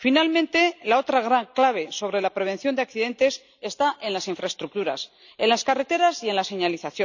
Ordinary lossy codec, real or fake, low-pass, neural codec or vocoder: none; real; 7.2 kHz; none